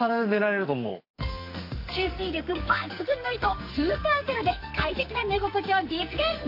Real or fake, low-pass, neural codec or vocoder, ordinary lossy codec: fake; 5.4 kHz; codec, 44.1 kHz, 2.6 kbps, SNAC; AAC, 32 kbps